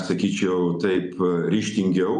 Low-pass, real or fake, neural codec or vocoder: 10.8 kHz; real; none